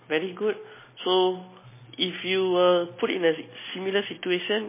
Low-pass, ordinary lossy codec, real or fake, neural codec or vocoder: 3.6 kHz; MP3, 16 kbps; real; none